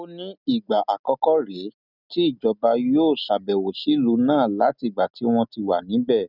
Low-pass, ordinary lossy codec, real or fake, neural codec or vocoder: 5.4 kHz; none; real; none